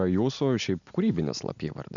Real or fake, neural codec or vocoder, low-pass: real; none; 7.2 kHz